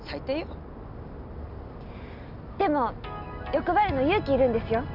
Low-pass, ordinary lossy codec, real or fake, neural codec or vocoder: 5.4 kHz; none; real; none